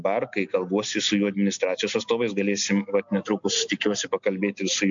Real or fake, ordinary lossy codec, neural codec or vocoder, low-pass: real; MP3, 64 kbps; none; 7.2 kHz